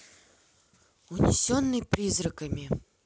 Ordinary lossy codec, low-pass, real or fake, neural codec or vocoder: none; none; real; none